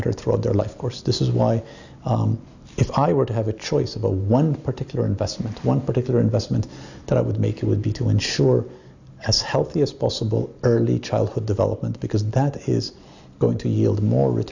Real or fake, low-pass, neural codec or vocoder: real; 7.2 kHz; none